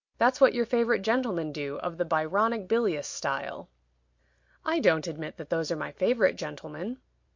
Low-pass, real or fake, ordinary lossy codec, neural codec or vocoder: 7.2 kHz; real; MP3, 48 kbps; none